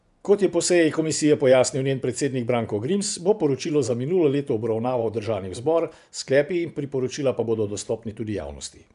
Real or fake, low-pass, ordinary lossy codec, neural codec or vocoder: fake; 10.8 kHz; none; vocoder, 24 kHz, 100 mel bands, Vocos